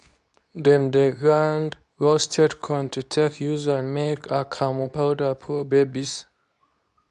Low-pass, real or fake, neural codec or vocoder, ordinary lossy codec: 10.8 kHz; fake; codec, 24 kHz, 0.9 kbps, WavTokenizer, medium speech release version 2; none